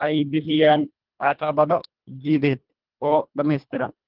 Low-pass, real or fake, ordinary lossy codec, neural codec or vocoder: 5.4 kHz; fake; Opus, 32 kbps; codec, 24 kHz, 1.5 kbps, HILCodec